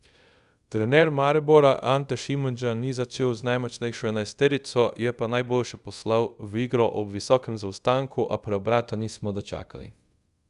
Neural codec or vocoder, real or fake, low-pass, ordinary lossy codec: codec, 24 kHz, 0.5 kbps, DualCodec; fake; 10.8 kHz; Opus, 64 kbps